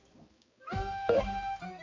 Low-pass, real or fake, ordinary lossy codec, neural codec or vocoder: 7.2 kHz; fake; MP3, 48 kbps; codec, 16 kHz, 2 kbps, X-Codec, HuBERT features, trained on balanced general audio